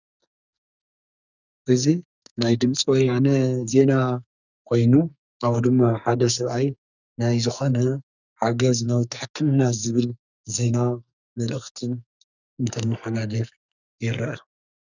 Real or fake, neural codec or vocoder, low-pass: fake; codec, 44.1 kHz, 2.6 kbps, SNAC; 7.2 kHz